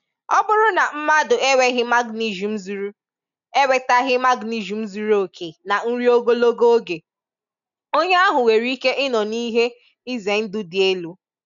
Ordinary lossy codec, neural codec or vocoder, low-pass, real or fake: none; none; 7.2 kHz; real